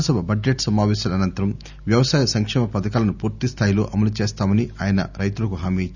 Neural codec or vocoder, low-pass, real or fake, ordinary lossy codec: none; 7.2 kHz; real; none